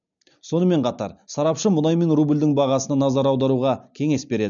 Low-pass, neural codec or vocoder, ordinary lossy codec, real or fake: 7.2 kHz; none; none; real